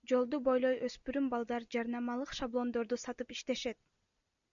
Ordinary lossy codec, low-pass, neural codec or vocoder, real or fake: MP3, 96 kbps; 7.2 kHz; none; real